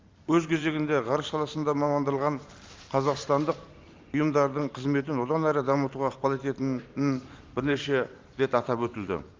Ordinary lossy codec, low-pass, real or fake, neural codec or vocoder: Opus, 32 kbps; 7.2 kHz; fake; vocoder, 44.1 kHz, 128 mel bands every 512 samples, BigVGAN v2